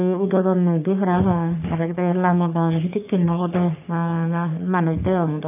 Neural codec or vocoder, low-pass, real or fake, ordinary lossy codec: codec, 44.1 kHz, 3.4 kbps, Pupu-Codec; 3.6 kHz; fake; none